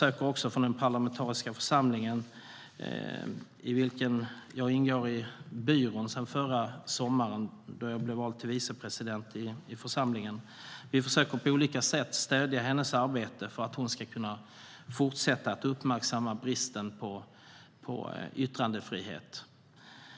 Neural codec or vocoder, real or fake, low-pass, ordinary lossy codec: none; real; none; none